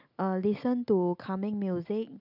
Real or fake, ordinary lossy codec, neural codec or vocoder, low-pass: real; none; none; 5.4 kHz